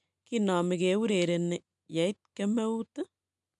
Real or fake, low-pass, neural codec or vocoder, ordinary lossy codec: real; 10.8 kHz; none; none